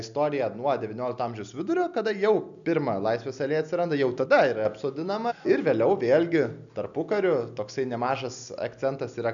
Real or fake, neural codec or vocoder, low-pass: real; none; 7.2 kHz